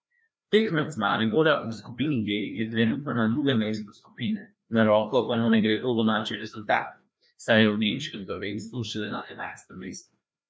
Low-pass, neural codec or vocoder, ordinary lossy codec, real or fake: none; codec, 16 kHz, 1 kbps, FreqCodec, larger model; none; fake